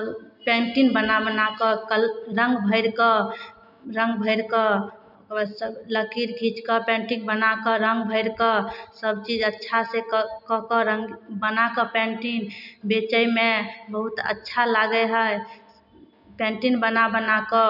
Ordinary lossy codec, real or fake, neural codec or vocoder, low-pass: none; real; none; 5.4 kHz